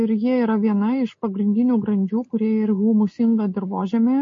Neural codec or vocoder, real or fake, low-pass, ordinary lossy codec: none; real; 7.2 kHz; MP3, 32 kbps